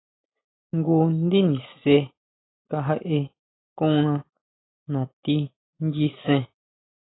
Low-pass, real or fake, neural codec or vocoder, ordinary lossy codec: 7.2 kHz; real; none; AAC, 16 kbps